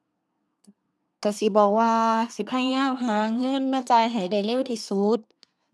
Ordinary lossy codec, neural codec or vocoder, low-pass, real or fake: none; codec, 24 kHz, 1 kbps, SNAC; none; fake